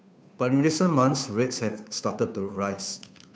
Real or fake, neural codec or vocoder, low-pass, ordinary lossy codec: fake; codec, 16 kHz, 2 kbps, FunCodec, trained on Chinese and English, 25 frames a second; none; none